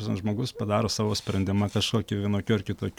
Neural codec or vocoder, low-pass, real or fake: none; 19.8 kHz; real